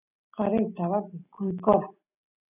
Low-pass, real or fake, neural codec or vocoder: 3.6 kHz; real; none